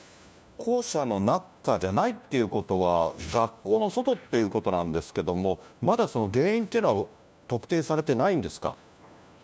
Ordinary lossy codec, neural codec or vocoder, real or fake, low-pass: none; codec, 16 kHz, 1 kbps, FunCodec, trained on LibriTTS, 50 frames a second; fake; none